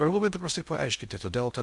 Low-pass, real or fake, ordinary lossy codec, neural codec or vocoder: 10.8 kHz; fake; MP3, 96 kbps; codec, 16 kHz in and 24 kHz out, 0.6 kbps, FocalCodec, streaming, 2048 codes